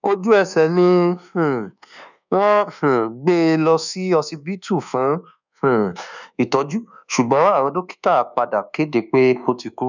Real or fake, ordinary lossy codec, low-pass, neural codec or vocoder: fake; none; 7.2 kHz; codec, 24 kHz, 1.2 kbps, DualCodec